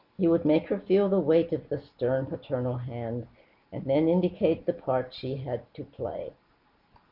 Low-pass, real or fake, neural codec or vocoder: 5.4 kHz; real; none